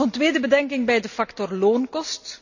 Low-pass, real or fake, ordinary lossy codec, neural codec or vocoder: 7.2 kHz; real; none; none